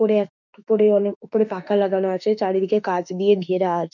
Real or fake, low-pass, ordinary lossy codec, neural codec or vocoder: fake; 7.2 kHz; none; autoencoder, 48 kHz, 32 numbers a frame, DAC-VAE, trained on Japanese speech